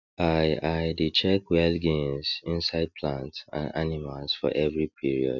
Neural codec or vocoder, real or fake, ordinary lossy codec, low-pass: none; real; none; 7.2 kHz